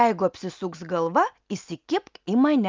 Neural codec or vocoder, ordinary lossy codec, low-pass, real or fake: none; Opus, 24 kbps; 7.2 kHz; real